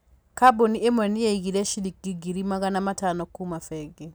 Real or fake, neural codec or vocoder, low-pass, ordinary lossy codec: real; none; none; none